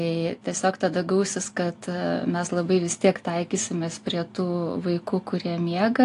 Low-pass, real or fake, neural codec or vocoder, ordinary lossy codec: 10.8 kHz; real; none; AAC, 48 kbps